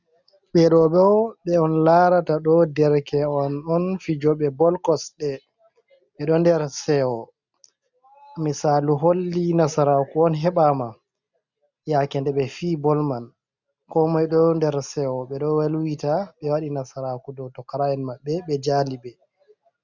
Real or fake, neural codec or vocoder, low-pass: real; none; 7.2 kHz